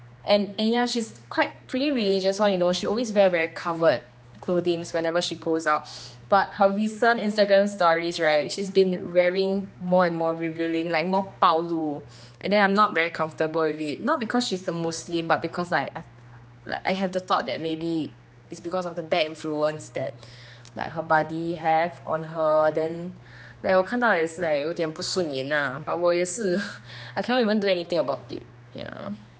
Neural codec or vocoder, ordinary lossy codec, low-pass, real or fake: codec, 16 kHz, 2 kbps, X-Codec, HuBERT features, trained on general audio; none; none; fake